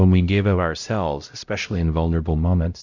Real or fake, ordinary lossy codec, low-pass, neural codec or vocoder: fake; Opus, 64 kbps; 7.2 kHz; codec, 16 kHz, 0.5 kbps, X-Codec, HuBERT features, trained on LibriSpeech